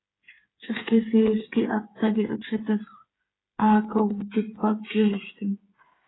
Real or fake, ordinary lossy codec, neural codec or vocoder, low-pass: fake; AAC, 16 kbps; codec, 16 kHz, 8 kbps, FreqCodec, smaller model; 7.2 kHz